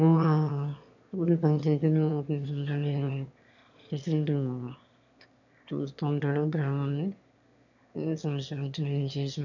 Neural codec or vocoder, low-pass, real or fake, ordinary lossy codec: autoencoder, 22.05 kHz, a latent of 192 numbers a frame, VITS, trained on one speaker; 7.2 kHz; fake; none